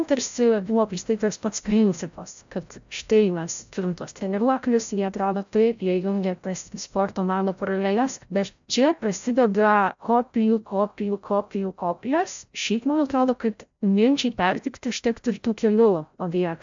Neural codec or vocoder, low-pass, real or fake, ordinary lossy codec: codec, 16 kHz, 0.5 kbps, FreqCodec, larger model; 7.2 kHz; fake; MP3, 64 kbps